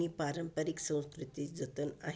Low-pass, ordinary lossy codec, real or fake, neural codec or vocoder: none; none; real; none